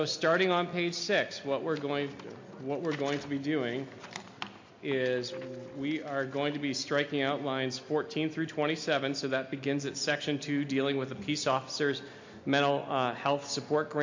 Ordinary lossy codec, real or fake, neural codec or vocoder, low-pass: MP3, 48 kbps; real; none; 7.2 kHz